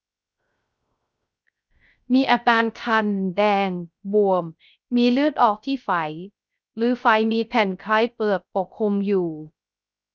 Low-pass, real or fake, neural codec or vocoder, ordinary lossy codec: none; fake; codec, 16 kHz, 0.3 kbps, FocalCodec; none